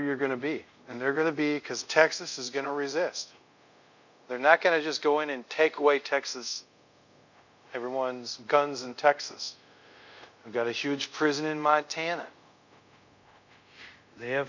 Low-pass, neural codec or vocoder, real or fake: 7.2 kHz; codec, 24 kHz, 0.5 kbps, DualCodec; fake